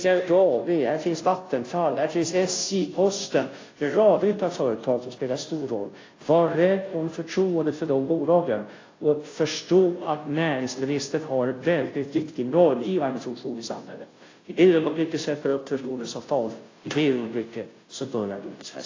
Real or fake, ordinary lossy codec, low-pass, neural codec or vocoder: fake; AAC, 32 kbps; 7.2 kHz; codec, 16 kHz, 0.5 kbps, FunCodec, trained on Chinese and English, 25 frames a second